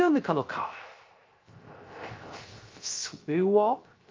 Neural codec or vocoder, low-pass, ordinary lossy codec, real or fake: codec, 16 kHz, 0.3 kbps, FocalCodec; 7.2 kHz; Opus, 24 kbps; fake